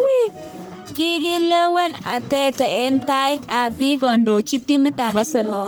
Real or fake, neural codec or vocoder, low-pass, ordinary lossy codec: fake; codec, 44.1 kHz, 1.7 kbps, Pupu-Codec; none; none